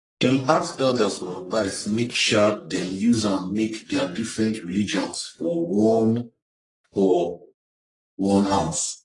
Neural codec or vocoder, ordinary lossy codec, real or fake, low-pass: codec, 44.1 kHz, 1.7 kbps, Pupu-Codec; AAC, 32 kbps; fake; 10.8 kHz